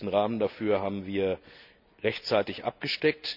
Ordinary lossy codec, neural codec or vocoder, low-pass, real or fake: none; none; 5.4 kHz; real